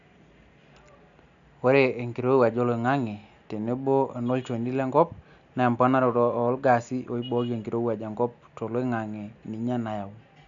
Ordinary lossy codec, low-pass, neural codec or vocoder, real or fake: none; 7.2 kHz; none; real